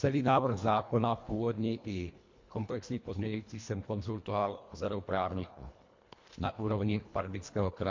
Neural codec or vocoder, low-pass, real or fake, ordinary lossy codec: codec, 24 kHz, 1.5 kbps, HILCodec; 7.2 kHz; fake; MP3, 48 kbps